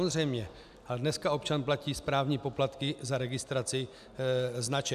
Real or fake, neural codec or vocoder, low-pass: real; none; 14.4 kHz